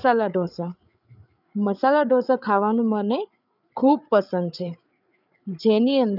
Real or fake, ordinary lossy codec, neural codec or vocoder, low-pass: fake; none; codec, 16 kHz, 8 kbps, FreqCodec, larger model; 5.4 kHz